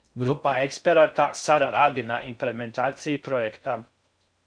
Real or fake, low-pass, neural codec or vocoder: fake; 9.9 kHz; codec, 16 kHz in and 24 kHz out, 0.6 kbps, FocalCodec, streaming, 4096 codes